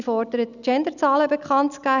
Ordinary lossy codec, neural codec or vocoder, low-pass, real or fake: none; none; 7.2 kHz; real